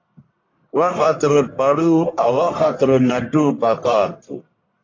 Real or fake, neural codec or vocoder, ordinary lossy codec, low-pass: fake; codec, 44.1 kHz, 1.7 kbps, Pupu-Codec; AAC, 32 kbps; 7.2 kHz